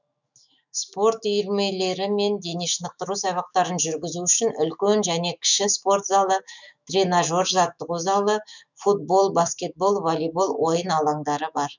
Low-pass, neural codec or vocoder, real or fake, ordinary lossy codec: 7.2 kHz; autoencoder, 48 kHz, 128 numbers a frame, DAC-VAE, trained on Japanese speech; fake; none